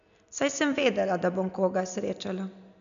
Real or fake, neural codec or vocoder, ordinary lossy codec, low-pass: real; none; none; 7.2 kHz